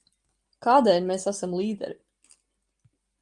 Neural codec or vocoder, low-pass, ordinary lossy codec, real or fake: none; 10.8 kHz; Opus, 32 kbps; real